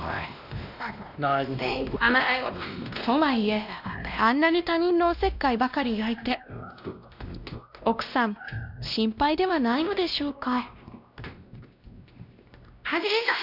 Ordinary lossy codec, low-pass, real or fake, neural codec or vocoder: none; 5.4 kHz; fake; codec, 16 kHz, 1 kbps, X-Codec, WavLM features, trained on Multilingual LibriSpeech